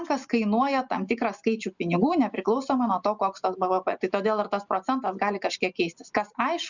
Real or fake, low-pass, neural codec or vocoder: real; 7.2 kHz; none